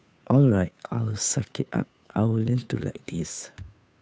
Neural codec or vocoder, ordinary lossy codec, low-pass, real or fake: codec, 16 kHz, 2 kbps, FunCodec, trained on Chinese and English, 25 frames a second; none; none; fake